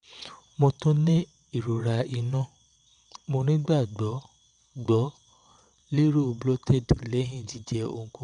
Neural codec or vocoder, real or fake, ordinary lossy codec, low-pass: vocoder, 22.05 kHz, 80 mel bands, WaveNeXt; fake; none; 9.9 kHz